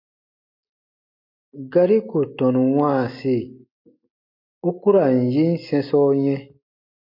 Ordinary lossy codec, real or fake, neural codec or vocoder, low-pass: MP3, 32 kbps; real; none; 5.4 kHz